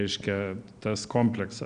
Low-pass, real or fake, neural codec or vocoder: 9.9 kHz; real; none